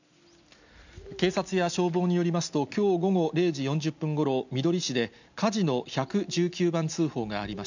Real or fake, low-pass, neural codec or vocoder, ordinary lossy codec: real; 7.2 kHz; none; none